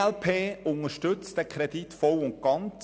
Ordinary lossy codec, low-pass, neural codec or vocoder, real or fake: none; none; none; real